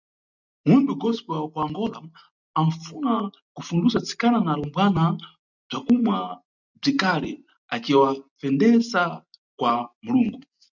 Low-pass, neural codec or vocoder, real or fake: 7.2 kHz; none; real